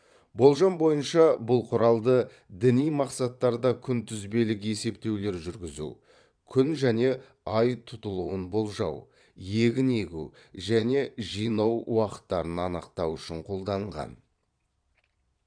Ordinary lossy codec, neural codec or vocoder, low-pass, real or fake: none; vocoder, 22.05 kHz, 80 mel bands, Vocos; 9.9 kHz; fake